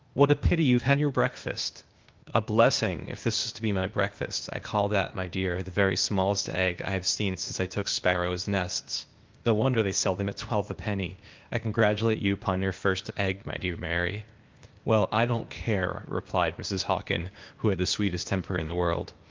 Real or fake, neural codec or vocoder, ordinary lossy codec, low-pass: fake; codec, 16 kHz, 0.8 kbps, ZipCodec; Opus, 32 kbps; 7.2 kHz